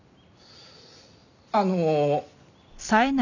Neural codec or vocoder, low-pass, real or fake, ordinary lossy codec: none; 7.2 kHz; real; none